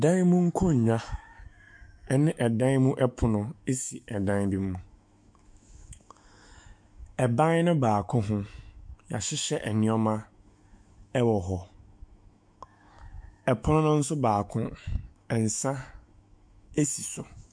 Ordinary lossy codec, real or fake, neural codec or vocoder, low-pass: MP3, 64 kbps; fake; autoencoder, 48 kHz, 128 numbers a frame, DAC-VAE, trained on Japanese speech; 9.9 kHz